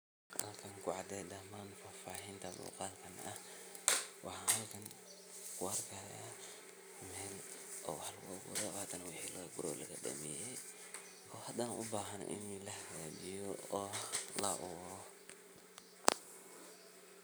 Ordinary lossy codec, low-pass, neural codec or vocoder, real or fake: none; none; none; real